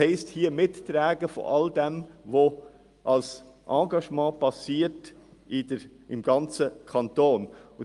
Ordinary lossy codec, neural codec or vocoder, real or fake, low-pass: Opus, 32 kbps; none; real; 10.8 kHz